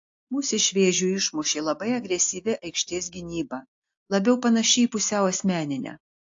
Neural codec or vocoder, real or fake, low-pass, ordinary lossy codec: none; real; 7.2 kHz; AAC, 48 kbps